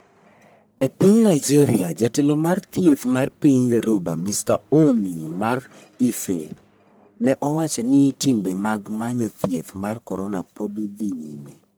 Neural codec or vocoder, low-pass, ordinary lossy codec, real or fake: codec, 44.1 kHz, 1.7 kbps, Pupu-Codec; none; none; fake